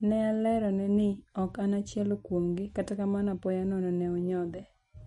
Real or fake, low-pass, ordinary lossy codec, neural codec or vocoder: real; 19.8 kHz; MP3, 48 kbps; none